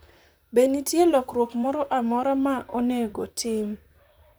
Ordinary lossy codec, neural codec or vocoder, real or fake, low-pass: none; vocoder, 44.1 kHz, 128 mel bands, Pupu-Vocoder; fake; none